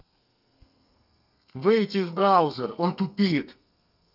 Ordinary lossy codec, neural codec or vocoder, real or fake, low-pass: none; codec, 32 kHz, 1.9 kbps, SNAC; fake; 5.4 kHz